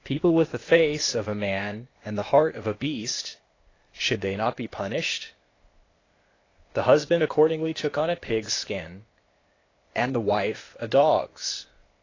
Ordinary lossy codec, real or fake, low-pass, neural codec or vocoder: AAC, 32 kbps; fake; 7.2 kHz; codec, 16 kHz, 0.8 kbps, ZipCodec